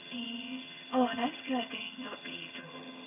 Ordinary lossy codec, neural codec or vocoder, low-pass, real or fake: none; vocoder, 22.05 kHz, 80 mel bands, HiFi-GAN; 3.6 kHz; fake